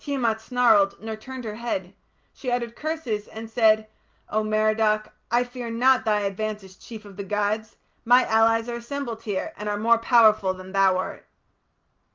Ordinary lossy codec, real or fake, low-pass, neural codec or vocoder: Opus, 24 kbps; real; 7.2 kHz; none